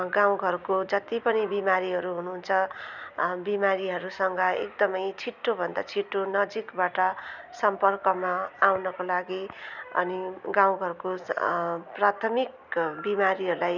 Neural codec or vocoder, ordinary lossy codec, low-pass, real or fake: none; none; 7.2 kHz; real